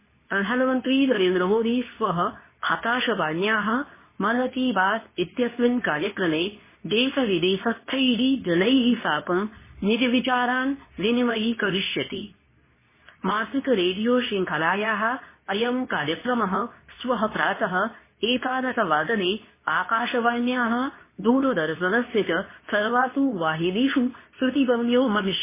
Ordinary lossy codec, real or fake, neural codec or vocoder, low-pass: MP3, 16 kbps; fake; codec, 24 kHz, 0.9 kbps, WavTokenizer, medium speech release version 2; 3.6 kHz